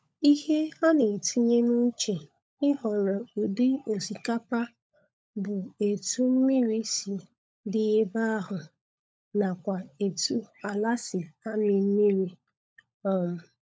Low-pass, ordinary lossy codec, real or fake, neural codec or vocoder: none; none; fake; codec, 16 kHz, 16 kbps, FunCodec, trained on LibriTTS, 50 frames a second